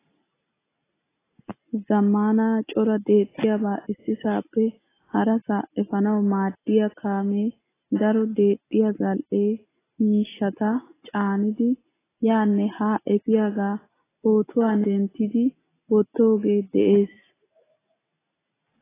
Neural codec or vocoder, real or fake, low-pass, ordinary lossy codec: none; real; 3.6 kHz; AAC, 16 kbps